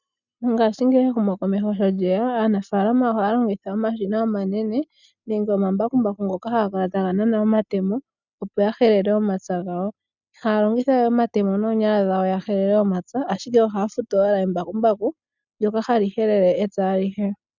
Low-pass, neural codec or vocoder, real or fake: 7.2 kHz; none; real